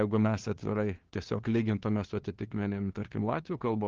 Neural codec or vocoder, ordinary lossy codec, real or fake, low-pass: codec, 16 kHz, 2 kbps, FunCodec, trained on LibriTTS, 25 frames a second; Opus, 16 kbps; fake; 7.2 kHz